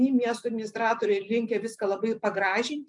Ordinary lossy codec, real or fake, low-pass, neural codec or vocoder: MP3, 96 kbps; real; 10.8 kHz; none